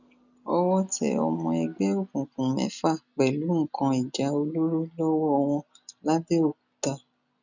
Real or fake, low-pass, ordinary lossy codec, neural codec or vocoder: real; 7.2 kHz; none; none